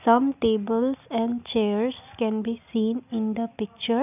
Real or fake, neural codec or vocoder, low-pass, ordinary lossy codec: real; none; 3.6 kHz; none